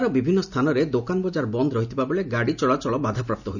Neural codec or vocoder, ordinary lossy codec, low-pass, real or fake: none; none; 7.2 kHz; real